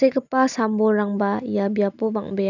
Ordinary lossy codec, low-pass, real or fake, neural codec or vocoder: none; 7.2 kHz; real; none